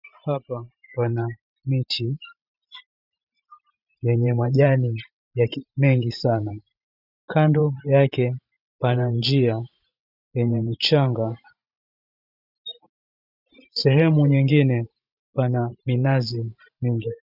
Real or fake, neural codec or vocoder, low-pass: fake; vocoder, 44.1 kHz, 128 mel bands every 512 samples, BigVGAN v2; 5.4 kHz